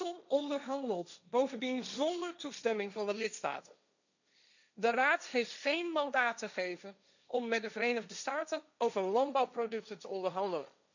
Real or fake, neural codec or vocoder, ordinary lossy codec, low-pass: fake; codec, 16 kHz, 1.1 kbps, Voila-Tokenizer; none; 7.2 kHz